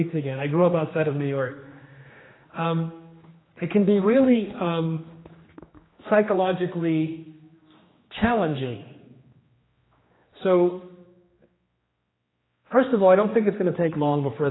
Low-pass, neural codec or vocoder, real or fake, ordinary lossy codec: 7.2 kHz; codec, 16 kHz, 2 kbps, X-Codec, HuBERT features, trained on general audio; fake; AAC, 16 kbps